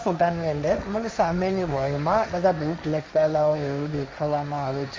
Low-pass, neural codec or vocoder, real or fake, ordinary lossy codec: none; codec, 16 kHz, 1.1 kbps, Voila-Tokenizer; fake; none